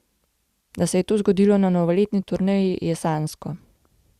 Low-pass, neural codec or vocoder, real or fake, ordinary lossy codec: 14.4 kHz; none; real; Opus, 64 kbps